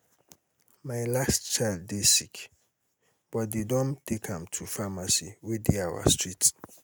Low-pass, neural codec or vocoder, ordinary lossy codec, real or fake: none; none; none; real